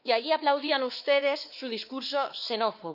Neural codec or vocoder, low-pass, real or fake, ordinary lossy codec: codec, 16 kHz, 2 kbps, X-Codec, WavLM features, trained on Multilingual LibriSpeech; 5.4 kHz; fake; none